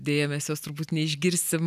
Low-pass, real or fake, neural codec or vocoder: 14.4 kHz; real; none